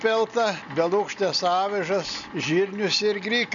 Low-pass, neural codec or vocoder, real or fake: 7.2 kHz; none; real